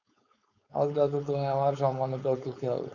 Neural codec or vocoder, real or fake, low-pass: codec, 16 kHz, 4.8 kbps, FACodec; fake; 7.2 kHz